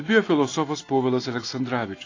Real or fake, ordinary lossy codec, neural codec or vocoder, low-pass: real; AAC, 32 kbps; none; 7.2 kHz